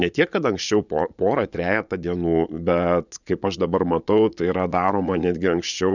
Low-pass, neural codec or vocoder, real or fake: 7.2 kHz; vocoder, 22.05 kHz, 80 mel bands, Vocos; fake